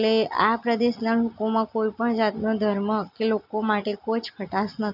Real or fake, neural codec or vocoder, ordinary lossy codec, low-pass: real; none; none; 5.4 kHz